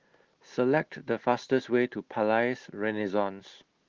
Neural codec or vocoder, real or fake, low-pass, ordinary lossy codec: none; real; 7.2 kHz; Opus, 16 kbps